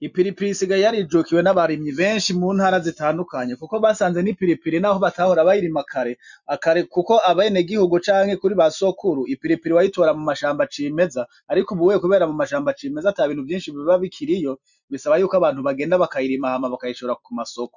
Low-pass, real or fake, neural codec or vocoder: 7.2 kHz; real; none